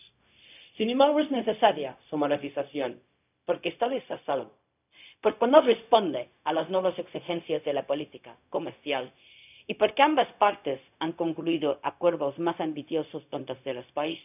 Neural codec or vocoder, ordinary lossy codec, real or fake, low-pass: codec, 16 kHz, 0.4 kbps, LongCat-Audio-Codec; none; fake; 3.6 kHz